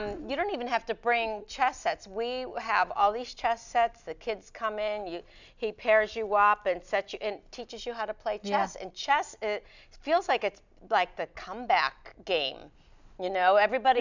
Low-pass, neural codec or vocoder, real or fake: 7.2 kHz; none; real